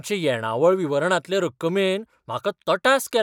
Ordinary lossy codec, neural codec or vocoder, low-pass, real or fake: none; none; 19.8 kHz; real